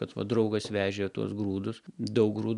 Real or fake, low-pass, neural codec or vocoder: real; 10.8 kHz; none